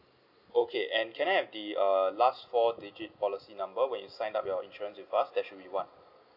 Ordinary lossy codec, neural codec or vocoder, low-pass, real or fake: none; none; 5.4 kHz; real